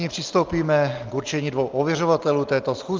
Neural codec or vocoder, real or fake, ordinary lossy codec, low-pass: none; real; Opus, 24 kbps; 7.2 kHz